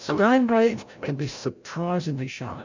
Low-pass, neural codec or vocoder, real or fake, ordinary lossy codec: 7.2 kHz; codec, 16 kHz, 0.5 kbps, FreqCodec, larger model; fake; MP3, 64 kbps